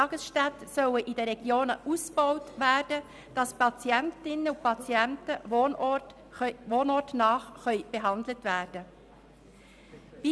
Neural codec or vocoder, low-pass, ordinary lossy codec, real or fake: none; none; none; real